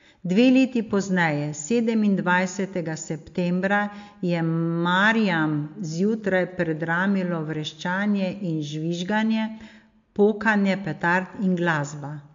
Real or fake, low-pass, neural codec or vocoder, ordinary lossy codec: real; 7.2 kHz; none; MP3, 48 kbps